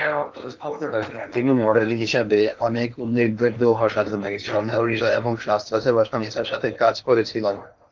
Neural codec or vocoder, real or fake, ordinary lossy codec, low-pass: codec, 16 kHz in and 24 kHz out, 0.8 kbps, FocalCodec, streaming, 65536 codes; fake; Opus, 32 kbps; 7.2 kHz